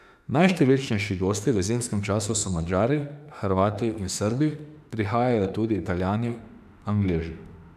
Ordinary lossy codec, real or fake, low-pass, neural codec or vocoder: none; fake; 14.4 kHz; autoencoder, 48 kHz, 32 numbers a frame, DAC-VAE, trained on Japanese speech